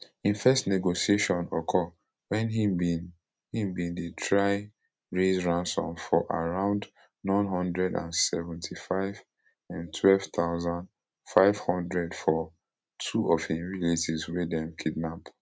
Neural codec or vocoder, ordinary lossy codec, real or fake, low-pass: none; none; real; none